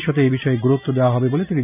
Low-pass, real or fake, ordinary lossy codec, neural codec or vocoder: 3.6 kHz; real; none; none